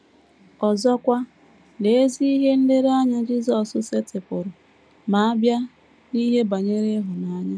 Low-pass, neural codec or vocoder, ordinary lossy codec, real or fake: none; none; none; real